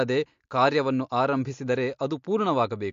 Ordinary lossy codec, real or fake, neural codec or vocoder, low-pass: MP3, 48 kbps; real; none; 7.2 kHz